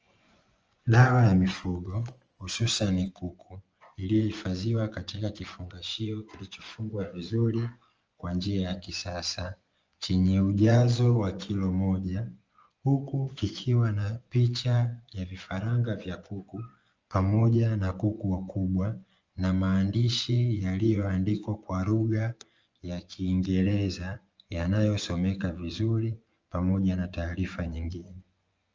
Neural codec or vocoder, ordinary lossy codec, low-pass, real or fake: vocoder, 24 kHz, 100 mel bands, Vocos; Opus, 24 kbps; 7.2 kHz; fake